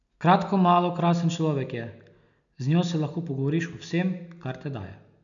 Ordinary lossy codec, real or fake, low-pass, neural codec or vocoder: none; real; 7.2 kHz; none